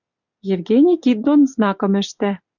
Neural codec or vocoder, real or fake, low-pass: none; real; 7.2 kHz